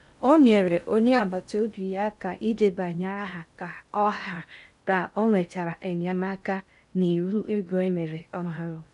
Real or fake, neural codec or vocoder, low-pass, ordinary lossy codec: fake; codec, 16 kHz in and 24 kHz out, 0.6 kbps, FocalCodec, streaming, 2048 codes; 10.8 kHz; none